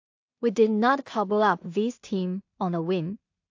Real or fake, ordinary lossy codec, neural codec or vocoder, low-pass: fake; AAC, 48 kbps; codec, 16 kHz in and 24 kHz out, 0.4 kbps, LongCat-Audio-Codec, two codebook decoder; 7.2 kHz